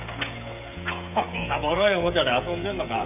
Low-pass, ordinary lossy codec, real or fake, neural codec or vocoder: 3.6 kHz; none; fake; codec, 16 kHz, 16 kbps, FreqCodec, smaller model